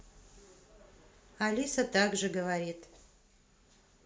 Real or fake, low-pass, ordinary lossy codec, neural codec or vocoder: real; none; none; none